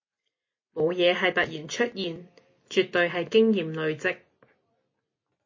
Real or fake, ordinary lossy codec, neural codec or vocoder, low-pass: real; MP3, 32 kbps; none; 7.2 kHz